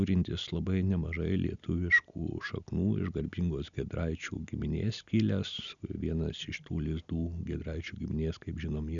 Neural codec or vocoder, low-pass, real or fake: none; 7.2 kHz; real